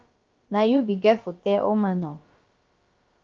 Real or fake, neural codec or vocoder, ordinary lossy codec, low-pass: fake; codec, 16 kHz, about 1 kbps, DyCAST, with the encoder's durations; Opus, 24 kbps; 7.2 kHz